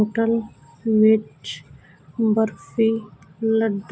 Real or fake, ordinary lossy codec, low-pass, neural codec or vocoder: real; none; none; none